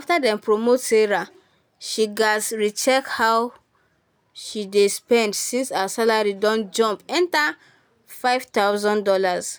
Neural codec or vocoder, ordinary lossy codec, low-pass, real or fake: none; none; none; real